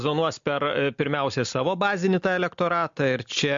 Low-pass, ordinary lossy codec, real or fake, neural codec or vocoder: 7.2 kHz; MP3, 48 kbps; real; none